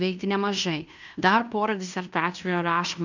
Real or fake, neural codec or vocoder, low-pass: fake; codec, 16 kHz in and 24 kHz out, 0.9 kbps, LongCat-Audio-Codec, fine tuned four codebook decoder; 7.2 kHz